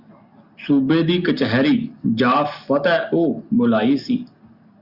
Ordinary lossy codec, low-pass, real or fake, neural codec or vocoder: Opus, 64 kbps; 5.4 kHz; real; none